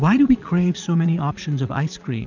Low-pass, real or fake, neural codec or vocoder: 7.2 kHz; fake; vocoder, 22.05 kHz, 80 mel bands, Vocos